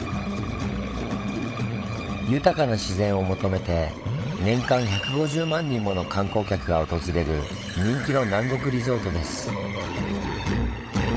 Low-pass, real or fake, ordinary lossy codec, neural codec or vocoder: none; fake; none; codec, 16 kHz, 16 kbps, FunCodec, trained on LibriTTS, 50 frames a second